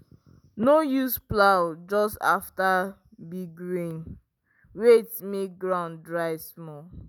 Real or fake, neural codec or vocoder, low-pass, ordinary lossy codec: real; none; none; none